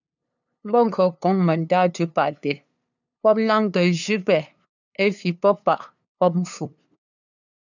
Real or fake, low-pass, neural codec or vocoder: fake; 7.2 kHz; codec, 16 kHz, 2 kbps, FunCodec, trained on LibriTTS, 25 frames a second